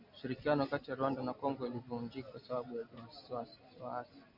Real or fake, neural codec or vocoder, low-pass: real; none; 5.4 kHz